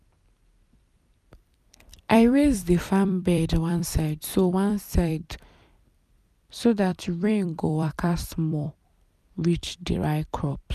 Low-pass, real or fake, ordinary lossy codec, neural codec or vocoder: 14.4 kHz; fake; none; vocoder, 44.1 kHz, 128 mel bands every 256 samples, BigVGAN v2